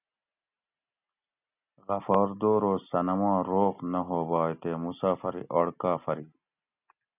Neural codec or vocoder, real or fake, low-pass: none; real; 3.6 kHz